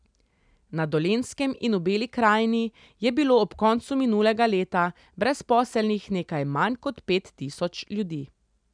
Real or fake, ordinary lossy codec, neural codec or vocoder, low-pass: real; none; none; 9.9 kHz